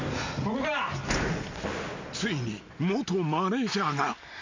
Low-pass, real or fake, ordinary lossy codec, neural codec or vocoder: 7.2 kHz; real; MP3, 64 kbps; none